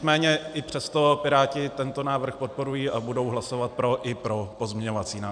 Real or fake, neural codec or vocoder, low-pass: real; none; 9.9 kHz